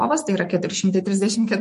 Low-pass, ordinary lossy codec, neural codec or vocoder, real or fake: 14.4 kHz; MP3, 48 kbps; autoencoder, 48 kHz, 128 numbers a frame, DAC-VAE, trained on Japanese speech; fake